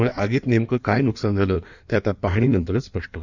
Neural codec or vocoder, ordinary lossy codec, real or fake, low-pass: codec, 16 kHz in and 24 kHz out, 1.1 kbps, FireRedTTS-2 codec; none; fake; 7.2 kHz